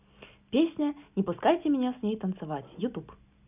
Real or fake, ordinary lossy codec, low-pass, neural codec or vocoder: real; none; 3.6 kHz; none